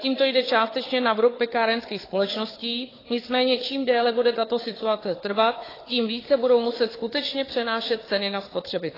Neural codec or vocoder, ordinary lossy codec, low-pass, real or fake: codec, 16 kHz, 4 kbps, FunCodec, trained on Chinese and English, 50 frames a second; AAC, 24 kbps; 5.4 kHz; fake